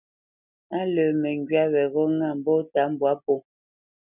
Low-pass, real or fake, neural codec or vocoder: 3.6 kHz; real; none